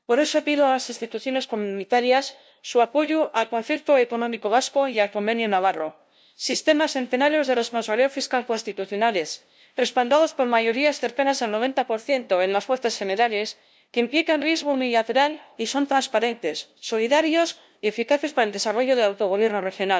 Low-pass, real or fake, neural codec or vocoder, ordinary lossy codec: none; fake; codec, 16 kHz, 0.5 kbps, FunCodec, trained on LibriTTS, 25 frames a second; none